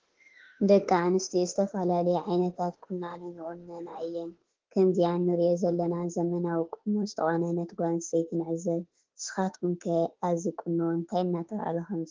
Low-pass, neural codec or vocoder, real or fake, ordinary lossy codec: 7.2 kHz; autoencoder, 48 kHz, 32 numbers a frame, DAC-VAE, trained on Japanese speech; fake; Opus, 16 kbps